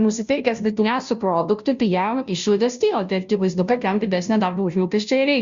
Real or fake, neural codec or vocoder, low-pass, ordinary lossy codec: fake; codec, 16 kHz, 0.5 kbps, FunCodec, trained on Chinese and English, 25 frames a second; 7.2 kHz; Opus, 64 kbps